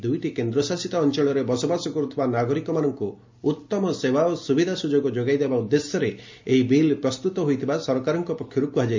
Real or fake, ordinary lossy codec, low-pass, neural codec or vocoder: real; MP3, 48 kbps; 7.2 kHz; none